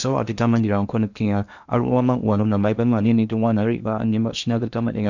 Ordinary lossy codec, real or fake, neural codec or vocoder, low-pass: none; fake; codec, 16 kHz in and 24 kHz out, 0.8 kbps, FocalCodec, streaming, 65536 codes; 7.2 kHz